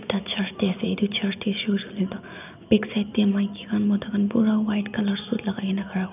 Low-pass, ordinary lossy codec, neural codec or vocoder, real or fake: 3.6 kHz; none; none; real